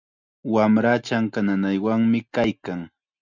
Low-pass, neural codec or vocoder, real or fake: 7.2 kHz; none; real